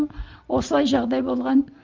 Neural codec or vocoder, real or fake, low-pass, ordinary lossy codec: none; real; 7.2 kHz; Opus, 24 kbps